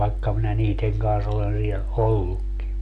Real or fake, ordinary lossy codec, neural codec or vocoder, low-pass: real; none; none; 10.8 kHz